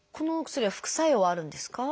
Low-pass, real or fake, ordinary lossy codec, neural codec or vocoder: none; real; none; none